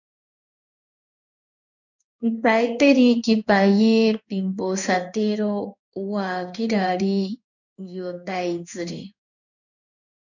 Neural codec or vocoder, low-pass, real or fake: codec, 16 kHz in and 24 kHz out, 1 kbps, XY-Tokenizer; 7.2 kHz; fake